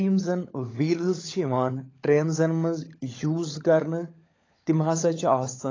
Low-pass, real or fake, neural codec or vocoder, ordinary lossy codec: 7.2 kHz; fake; codec, 16 kHz, 16 kbps, FunCodec, trained on LibriTTS, 50 frames a second; AAC, 32 kbps